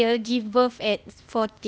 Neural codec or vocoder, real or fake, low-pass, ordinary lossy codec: codec, 16 kHz, 0.8 kbps, ZipCodec; fake; none; none